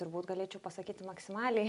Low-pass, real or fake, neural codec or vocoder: 10.8 kHz; real; none